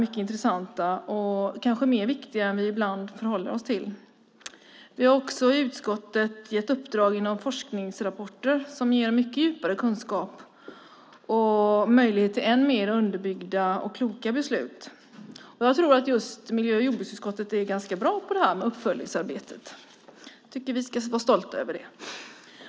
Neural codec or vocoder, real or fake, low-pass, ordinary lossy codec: none; real; none; none